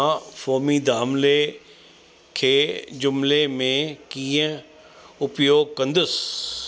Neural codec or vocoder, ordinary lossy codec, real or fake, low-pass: none; none; real; none